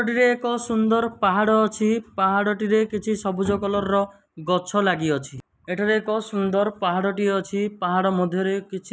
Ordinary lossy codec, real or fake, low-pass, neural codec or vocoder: none; real; none; none